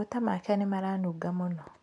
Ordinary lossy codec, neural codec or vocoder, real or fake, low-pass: none; none; real; 10.8 kHz